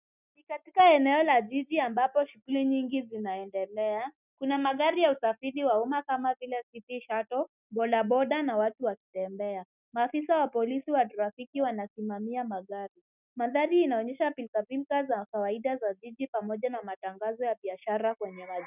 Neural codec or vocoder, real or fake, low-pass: none; real; 3.6 kHz